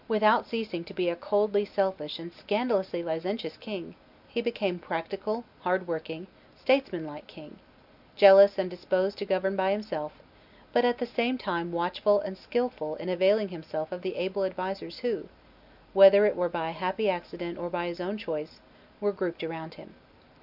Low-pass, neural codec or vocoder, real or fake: 5.4 kHz; none; real